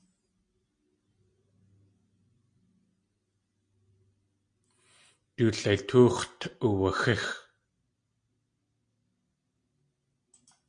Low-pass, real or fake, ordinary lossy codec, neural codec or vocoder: 9.9 kHz; real; AAC, 64 kbps; none